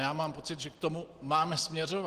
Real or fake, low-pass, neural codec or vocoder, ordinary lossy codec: real; 14.4 kHz; none; Opus, 16 kbps